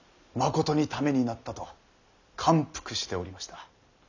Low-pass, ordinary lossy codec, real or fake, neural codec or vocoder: 7.2 kHz; none; real; none